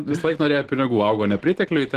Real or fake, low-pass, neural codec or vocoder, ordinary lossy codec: real; 14.4 kHz; none; Opus, 16 kbps